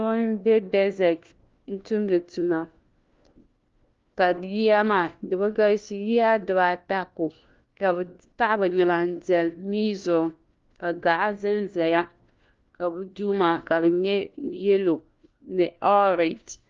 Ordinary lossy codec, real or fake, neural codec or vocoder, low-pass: Opus, 32 kbps; fake; codec, 16 kHz, 1 kbps, FunCodec, trained on LibriTTS, 50 frames a second; 7.2 kHz